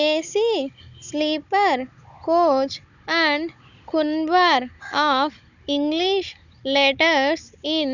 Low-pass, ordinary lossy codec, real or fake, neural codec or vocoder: 7.2 kHz; none; fake; codec, 16 kHz, 16 kbps, FunCodec, trained on Chinese and English, 50 frames a second